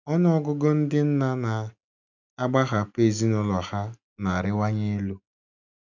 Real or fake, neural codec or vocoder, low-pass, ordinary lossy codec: real; none; 7.2 kHz; none